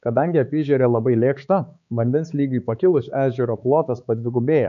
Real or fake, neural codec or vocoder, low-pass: fake; codec, 16 kHz, 4 kbps, X-Codec, HuBERT features, trained on balanced general audio; 7.2 kHz